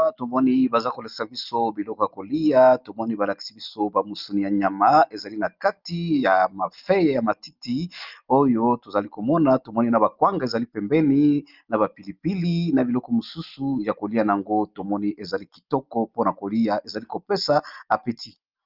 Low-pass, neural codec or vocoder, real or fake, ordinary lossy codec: 5.4 kHz; none; real; Opus, 32 kbps